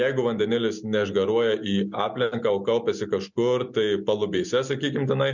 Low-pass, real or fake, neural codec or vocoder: 7.2 kHz; real; none